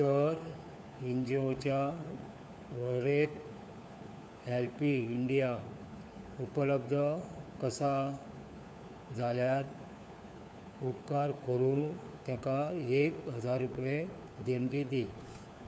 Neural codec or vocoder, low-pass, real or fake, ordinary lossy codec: codec, 16 kHz, 4 kbps, FunCodec, trained on LibriTTS, 50 frames a second; none; fake; none